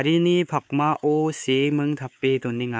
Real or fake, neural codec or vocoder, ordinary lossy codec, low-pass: fake; codec, 16 kHz, 4 kbps, X-Codec, WavLM features, trained on Multilingual LibriSpeech; none; none